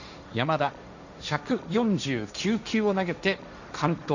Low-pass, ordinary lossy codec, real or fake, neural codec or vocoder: 7.2 kHz; none; fake; codec, 16 kHz, 1.1 kbps, Voila-Tokenizer